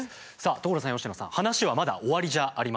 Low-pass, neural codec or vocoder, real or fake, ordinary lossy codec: none; none; real; none